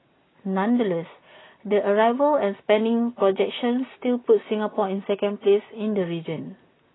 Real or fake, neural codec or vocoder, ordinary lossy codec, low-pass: real; none; AAC, 16 kbps; 7.2 kHz